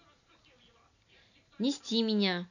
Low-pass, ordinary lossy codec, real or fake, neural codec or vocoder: 7.2 kHz; none; real; none